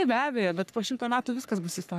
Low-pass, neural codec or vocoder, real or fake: 14.4 kHz; codec, 44.1 kHz, 3.4 kbps, Pupu-Codec; fake